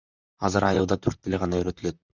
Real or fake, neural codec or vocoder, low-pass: fake; vocoder, 44.1 kHz, 128 mel bands, Pupu-Vocoder; 7.2 kHz